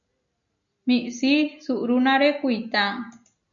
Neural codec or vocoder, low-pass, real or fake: none; 7.2 kHz; real